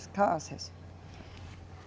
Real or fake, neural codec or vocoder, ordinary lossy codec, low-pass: real; none; none; none